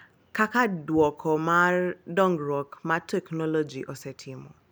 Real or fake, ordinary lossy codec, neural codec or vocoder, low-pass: real; none; none; none